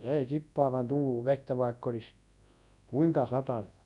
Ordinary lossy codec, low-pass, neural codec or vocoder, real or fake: MP3, 96 kbps; 10.8 kHz; codec, 24 kHz, 0.9 kbps, WavTokenizer, large speech release; fake